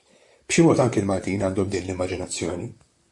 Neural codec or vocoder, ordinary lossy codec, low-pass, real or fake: vocoder, 44.1 kHz, 128 mel bands, Pupu-Vocoder; AAC, 64 kbps; 10.8 kHz; fake